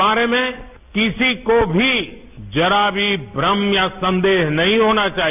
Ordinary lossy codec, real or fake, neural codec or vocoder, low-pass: none; real; none; 3.6 kHz